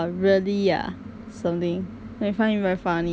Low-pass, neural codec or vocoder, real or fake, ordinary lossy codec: none; none; real; none